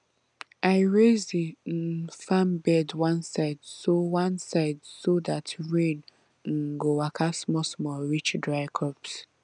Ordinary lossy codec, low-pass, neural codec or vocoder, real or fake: none; 9.9 kHz; none; real